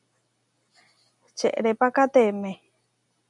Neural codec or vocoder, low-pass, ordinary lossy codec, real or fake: none; 10.8 kHz; MP3, 96 kbps; real